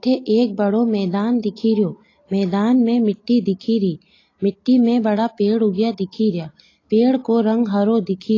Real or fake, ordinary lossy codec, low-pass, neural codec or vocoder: real; AAC, 32 kbps; 7.2 kHz; none